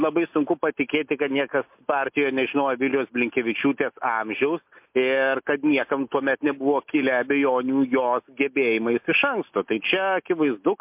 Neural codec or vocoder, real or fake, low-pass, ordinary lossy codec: none; real; 3.6 kHz; MP3, 32 kbps